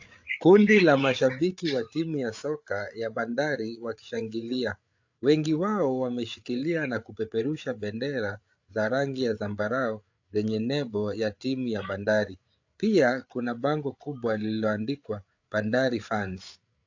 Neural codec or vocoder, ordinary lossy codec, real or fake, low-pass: codec, 16 kHz, 8 kbps, FreqCodec, larger model; AAC, 48 kbps; fake; 7.2 kHz